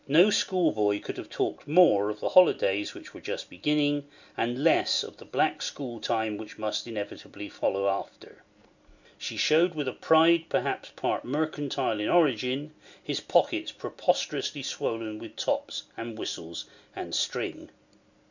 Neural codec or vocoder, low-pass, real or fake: none; 7.2 kHz; real